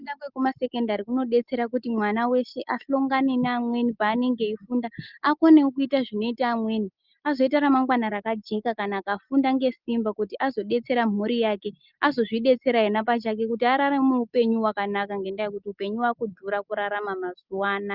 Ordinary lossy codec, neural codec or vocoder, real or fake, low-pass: Opus, 24 kbps; none; real; 5.4 kHz